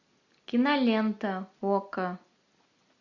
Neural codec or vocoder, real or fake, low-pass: none; real; 7.2 kHz